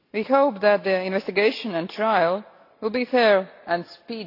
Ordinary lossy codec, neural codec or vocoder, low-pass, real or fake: AAC, 32 kbps; none; 5.4 kHz; real